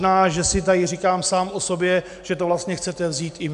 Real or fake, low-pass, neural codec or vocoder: real; 10.8 kHz; none